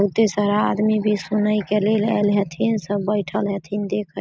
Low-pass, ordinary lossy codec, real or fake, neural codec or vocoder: none; none; real; none